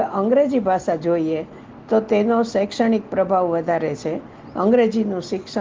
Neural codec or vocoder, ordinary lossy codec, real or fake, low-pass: none; Opus, 16 kbps; real; 7.2 kHz